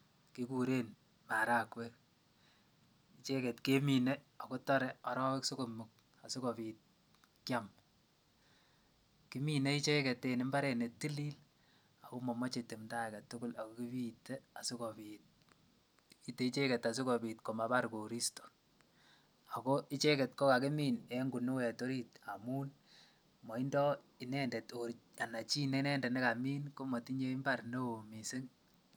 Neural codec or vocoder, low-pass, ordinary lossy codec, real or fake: none; none; none; real